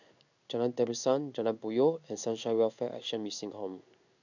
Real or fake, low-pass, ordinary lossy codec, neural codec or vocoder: fake; 7.2 kHz; none; codec, 16 kHz in and 24 kHz out, 1 kbps, XY-Tokenizer